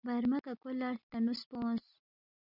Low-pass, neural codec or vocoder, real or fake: 5.4 kHz; none; real